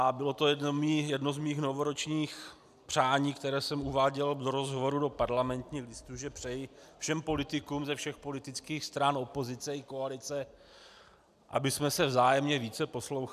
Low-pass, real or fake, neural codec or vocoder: 14.4 kHz; real; none